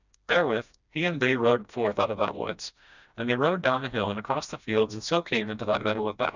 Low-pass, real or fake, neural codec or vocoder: 7.2 kHz; fake; codec, 16 kHz, 1 kbps, FreqCodec, smaller model